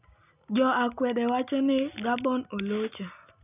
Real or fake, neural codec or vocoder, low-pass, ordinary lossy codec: real; none; 3.6 kHz; none